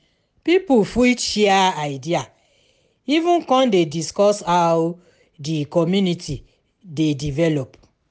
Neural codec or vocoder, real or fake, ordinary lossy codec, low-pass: none; real; none; none